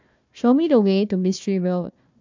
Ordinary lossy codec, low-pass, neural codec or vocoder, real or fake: MP3, 64 kbps; 7.2 kHz; codec, 16 kHz, 1 kbps, FunCodec, trained on Chinese and English, 50 frames a second; fake